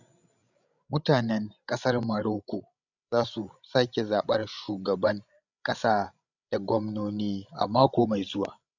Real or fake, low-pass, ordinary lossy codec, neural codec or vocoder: fake; 7.2 kHz; none; codec, 16 kHz, 16 kbps, FreqCodec, larger model